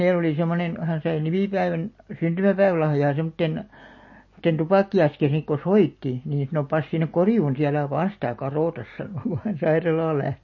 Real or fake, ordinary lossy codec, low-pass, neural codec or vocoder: real; MP3, 32 kbps; 7.2 kHz; none